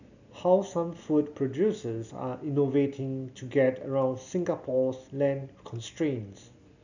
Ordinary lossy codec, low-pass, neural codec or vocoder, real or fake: none; 7.2 kHz; none; real